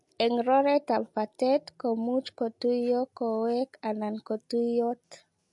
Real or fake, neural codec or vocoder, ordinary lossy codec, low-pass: real; none; MP3, 48 kbps; 10.8 kHz